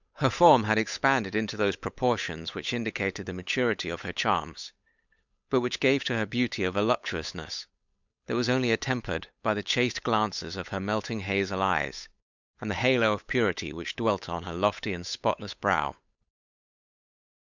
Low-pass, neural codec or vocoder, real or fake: 7.2 kHz; codec, 16 kHz, 8 kbps, FunCodec, trained on Chinese and English, 25 frames a second; fake